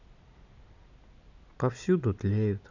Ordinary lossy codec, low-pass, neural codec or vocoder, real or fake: none; 7.2 kHz; none; real